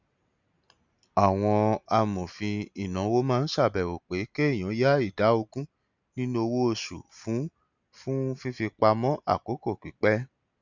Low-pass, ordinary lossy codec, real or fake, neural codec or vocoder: 7.2 kHz; none; real; none